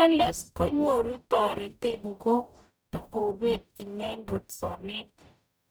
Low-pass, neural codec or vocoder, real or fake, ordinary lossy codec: none; codec, 44.1 kHz, 0.9 kbps, DAC; fake; none